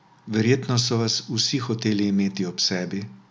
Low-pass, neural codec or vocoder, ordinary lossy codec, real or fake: none; none; none; real